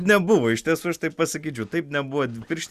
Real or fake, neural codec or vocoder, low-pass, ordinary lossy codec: real; none; 14.4 kHz; Opus, 64 kbps